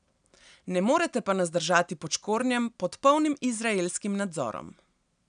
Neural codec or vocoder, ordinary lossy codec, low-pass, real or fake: none; none; 9.9 kHz; real